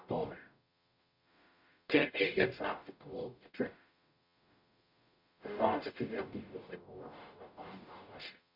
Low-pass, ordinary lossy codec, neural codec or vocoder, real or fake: 5.4 kHz; MP3, 48 kbps; codec, 44.1 kHz, 0.9 kbps, DAC; fake